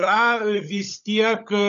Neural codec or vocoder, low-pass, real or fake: codec, 16 kHz, 16 kbps, FunCodec, trained on LibriTTS, 50 frames a second; 7.2 kHz; fake